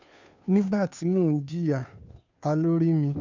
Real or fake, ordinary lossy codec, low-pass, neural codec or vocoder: fake; none; 7.2 kHz; codec, 16 kHz, 2 kbps, FunCodec, trained on Chinese and English, 25 frames a second